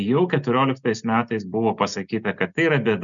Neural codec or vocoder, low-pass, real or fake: none; 7.2 kHz; real